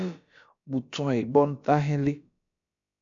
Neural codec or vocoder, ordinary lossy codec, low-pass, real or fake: codec, 16 kHz, about 1 kbps, DyCAST, with the encoder's durations; MP3, 64 kbps; 7.2 kHz; fake